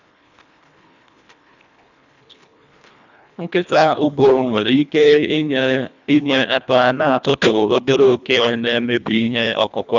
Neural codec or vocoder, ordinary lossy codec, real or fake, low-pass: codec, 24 kHz, 1.5 kbps, HILCodec; none; fake; 7.2 kHz